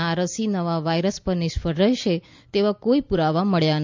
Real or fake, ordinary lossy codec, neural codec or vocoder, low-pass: real; MP3, 64 kbps; none; 7.2 kHz